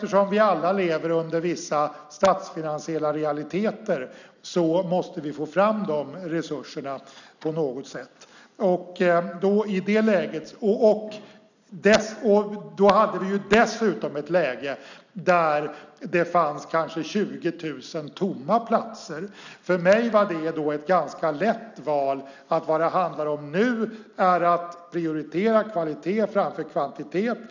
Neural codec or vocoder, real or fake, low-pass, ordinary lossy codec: none; real; 7.2 kHz; none